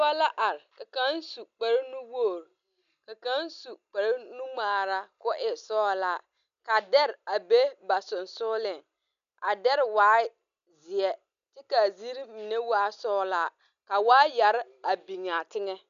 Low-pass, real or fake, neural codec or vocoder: 7.2 kHz; real; none